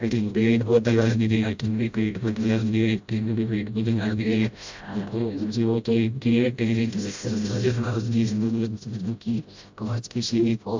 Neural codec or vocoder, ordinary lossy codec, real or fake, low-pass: codec, 16 kHz, 0.5 kbps, FreqCodec, smaller model; MP3, 64 kbps; fake; 7.2 kHz